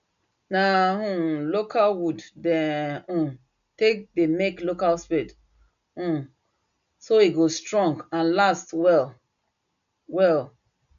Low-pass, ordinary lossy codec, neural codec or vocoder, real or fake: 7.2 kHz; none; none; real